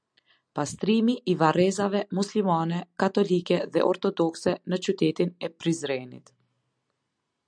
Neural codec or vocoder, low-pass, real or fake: none; 9.9 kHz; real